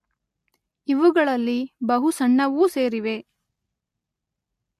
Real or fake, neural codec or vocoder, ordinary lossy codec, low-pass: real; none; MP3, 64 kbps; 14.4 kHz